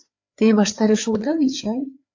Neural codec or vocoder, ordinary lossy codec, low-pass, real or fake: codec, 16 kHz, 4 kbps, FreqCodec, larger model; AAC, 48 kbps; 7.2 kHz; fake